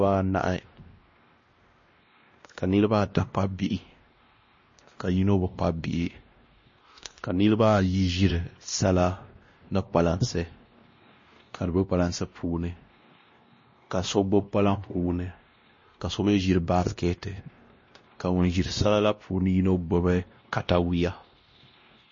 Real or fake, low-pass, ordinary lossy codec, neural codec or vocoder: fake; 7.2 kHz; MP3, 32 kbps; codec, 16 kHz, 1 kbps, X-Codec, WavLM features, trained on Multilingual LibriSpeech